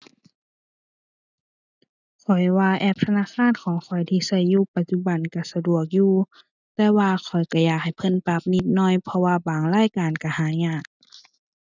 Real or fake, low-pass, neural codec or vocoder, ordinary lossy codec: real; 7.2 kHz; none; none